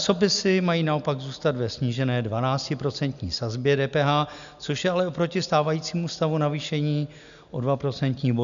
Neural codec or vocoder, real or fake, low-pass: none; real; 7.2 kHz